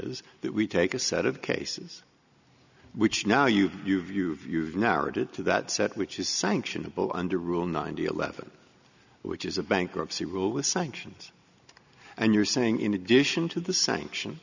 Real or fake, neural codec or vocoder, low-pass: real; none; 7.2 kHz